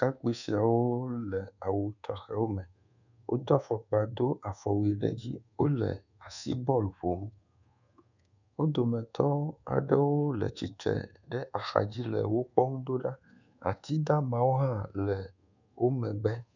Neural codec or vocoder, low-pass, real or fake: codec, 24 kHz, 1.2 kbps, DualCodec; 7.2 kHz; fake